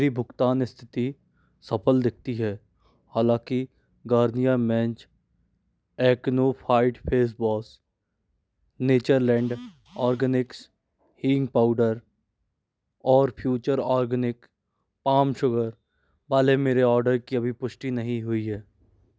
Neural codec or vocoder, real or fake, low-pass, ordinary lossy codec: none; real; none; none